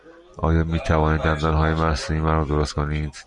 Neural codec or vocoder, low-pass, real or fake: none; 10.8 kHz; real